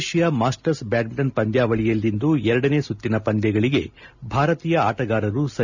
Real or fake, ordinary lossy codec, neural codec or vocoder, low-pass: real; none; none; 7.2 kHz